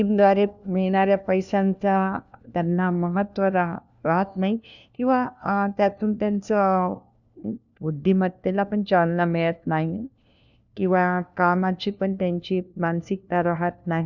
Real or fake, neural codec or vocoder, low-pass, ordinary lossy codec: fake; codec, 16 kHz, 1 kbps, FunCodec, trained on LibriTTS, 50 frames a second; 7.2 kHz; none